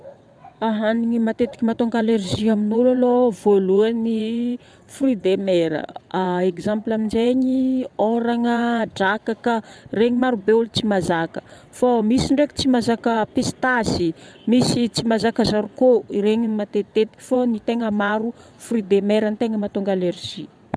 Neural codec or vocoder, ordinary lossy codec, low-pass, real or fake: vocoder, 22.05 kHz, 80 mel bands, WaveNeXt; none; none; fake